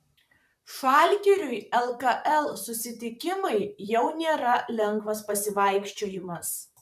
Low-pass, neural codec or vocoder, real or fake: 14.4 kHz; vocoder, 44.1 kHz, 128 mel bands, Pupu-Vocoder; fake